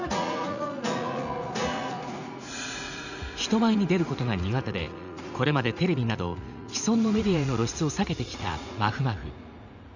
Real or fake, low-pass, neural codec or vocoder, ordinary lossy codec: fake; 7.2 kHz; vocoder, 44.1 kHz, 80 mel bands, Vocos; none